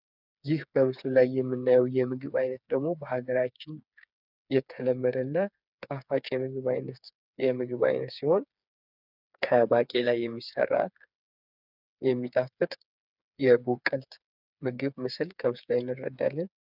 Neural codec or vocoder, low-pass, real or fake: codec, 16 kHz, 4 kbps, FreqCodec, smaller model; 5.4 kHz; fake